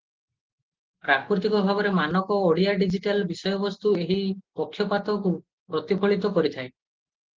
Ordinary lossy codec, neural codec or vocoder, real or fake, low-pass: Opus, 16 kbps; none; real; 7.2 kHz